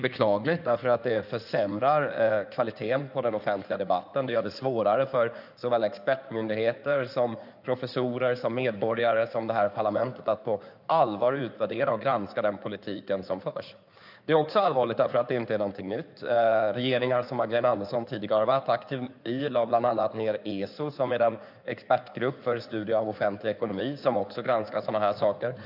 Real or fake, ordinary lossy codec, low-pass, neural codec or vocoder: fake; none; 5.4 kHz; codec, 16 kHz in and 24 kHz out, 2.2 kbps, FireRedTTS-2 codec